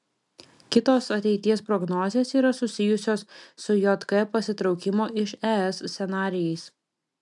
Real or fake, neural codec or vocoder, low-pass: real; none; 10.8 kHz